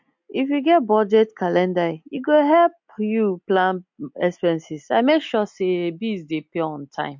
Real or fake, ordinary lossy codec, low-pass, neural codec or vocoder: real; MP3, 48 kbps; 7.2 kHz; none